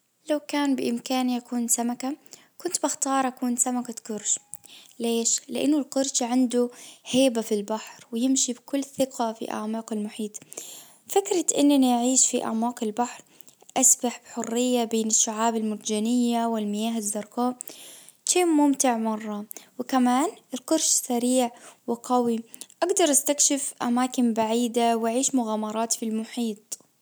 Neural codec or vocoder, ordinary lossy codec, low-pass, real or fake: none; none; none; real